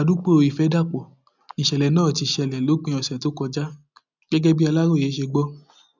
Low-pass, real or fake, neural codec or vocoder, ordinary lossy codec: 7.2 kHz; real; none; none